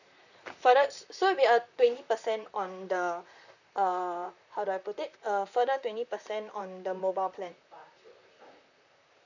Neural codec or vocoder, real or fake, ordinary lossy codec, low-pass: vocoder, 44.1 kHz, 128 mel bands, Pupu-Vocoder; fake; none; 7.2 kHz